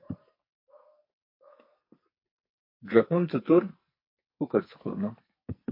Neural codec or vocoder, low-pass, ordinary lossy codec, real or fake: codec, 44.1 kHz, 2.6 kbps, SNAC; 5.4 kHz; MP3, 32 kbps; fake